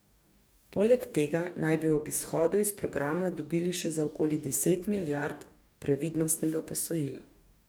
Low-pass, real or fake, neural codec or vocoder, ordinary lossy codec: none; fake; codec, 44.1 kHz, 2.6 kbps, DAC; none